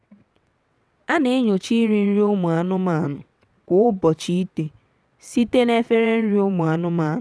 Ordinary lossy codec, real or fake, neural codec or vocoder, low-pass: none; fake; vocoder, 22.05 kHz, 80 mel bands, WaveNeXt; none